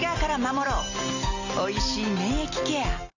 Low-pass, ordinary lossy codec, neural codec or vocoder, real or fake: 7.2 kHz; Opus, 64 kbps; none; real